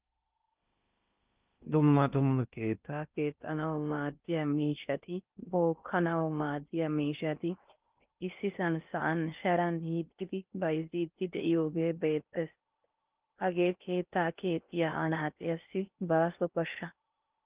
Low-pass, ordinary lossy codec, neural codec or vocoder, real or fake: 3.6 kHz; Opus, 24 kbps; codec, 16 kHz in and 24 kHz out, 0.6 kbps, FocalCodec, streaming, 4096 codes; fake